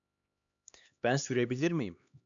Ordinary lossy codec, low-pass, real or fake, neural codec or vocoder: AAC, 64 kbps; 7.2 kHz; fake; codec, 16 kHz, 2 kbps, X-Codec, HuBERT features, trained on LibriSpeech